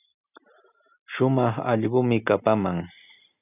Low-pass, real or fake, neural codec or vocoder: 3.6 kHz; real; none